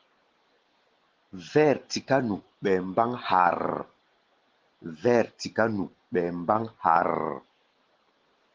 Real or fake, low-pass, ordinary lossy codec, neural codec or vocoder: real; 7.2 kHz; Opus, 16 kbps; none